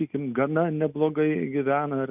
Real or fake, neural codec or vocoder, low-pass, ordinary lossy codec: real; none; 3.6 kHz; AAC, 32 kbps